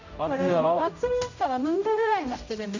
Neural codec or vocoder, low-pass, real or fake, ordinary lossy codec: codec, 16 kHz, 0.5 kbps, X-Codec, HuBERT features, trained on general audio; 7.2 kHz; fake; none